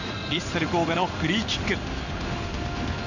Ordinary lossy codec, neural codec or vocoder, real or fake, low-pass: none; codec, 16 kHz in and 24 kHz out, 1 kbps, XY-Tokenizer; fake; 7.2 kHz